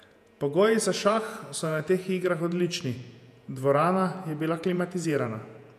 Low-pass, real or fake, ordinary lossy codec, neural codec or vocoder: 14.4 kHz; fake; none; vocoder, 48 kHz, 128 mel bands, Vocos